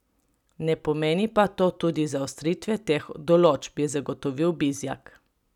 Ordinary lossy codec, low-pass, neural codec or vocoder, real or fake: none; 19.8 kHz; none; real